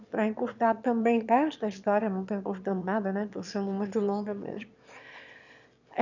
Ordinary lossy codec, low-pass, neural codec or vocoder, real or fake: none; 7.2 kHz; autoencoder, 22.05 kHz, a latent of 192 numbers a frame, VITS, trained on one speaker; fake